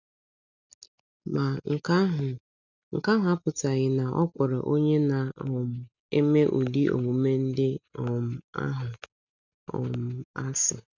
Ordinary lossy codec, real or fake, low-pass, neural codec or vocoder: none; real; 7.2 kHz; none